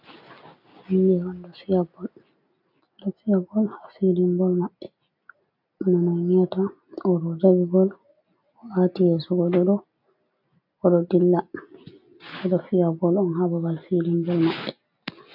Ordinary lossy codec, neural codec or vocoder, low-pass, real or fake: AAC, 48 kbps; none; 5.4 kHz; real